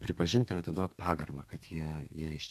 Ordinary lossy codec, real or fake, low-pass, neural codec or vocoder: AAC, 96 kbps; fake; 14.4 kHz; codec, 32 kHz, 1.9 kbps, SNAC